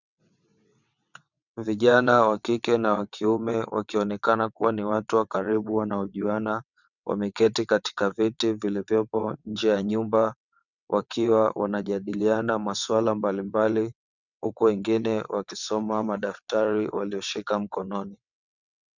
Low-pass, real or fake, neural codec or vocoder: 7.2 kHz; fake; vocoder, 22.05 kHz, 80 mel bands, WaveNeXt